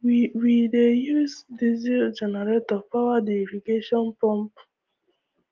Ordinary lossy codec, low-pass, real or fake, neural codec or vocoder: Opus, 32 kbps; 7.2 kHz; real; none